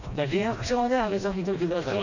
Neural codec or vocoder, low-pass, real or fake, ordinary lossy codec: codec, 16 kHz, 1 kbps, FreqCodec, smaller model; 7.2 kHz; fake; none